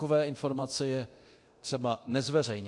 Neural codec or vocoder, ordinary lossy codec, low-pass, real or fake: codec, 24 kHz, 0.9 kbps, DualCodec; AAC, 48 kbps; 10.8 kHz; fake